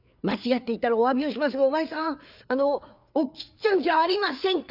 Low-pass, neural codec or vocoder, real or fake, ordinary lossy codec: 5.4 kHz; codec, 16 kHz, 4 kbps, FreqCodec, larger model; fake; none